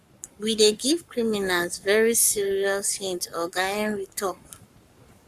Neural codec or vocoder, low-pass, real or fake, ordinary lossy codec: codec, 44.1 kHz, 7.8 kbps, Pupu-Codec; 14.4 kHz; fake; Opus, 64 kbps